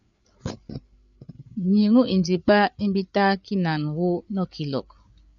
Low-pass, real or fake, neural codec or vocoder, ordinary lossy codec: 7.2 kHz; fake; codec, 16 kHz, 8 kbps, FreqCodec, larger model; MP3, 96 kbps